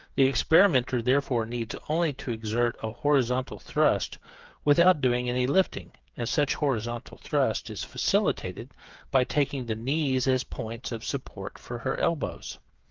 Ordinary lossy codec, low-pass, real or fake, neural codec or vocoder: Opus, 24 kbps; 7.2 kHz; fake; codec, 16 kHz, 8 kbps, FreqCodec, smaller model